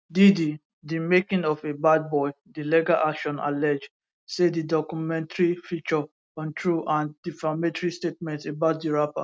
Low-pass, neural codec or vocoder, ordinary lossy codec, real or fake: none; none; none; real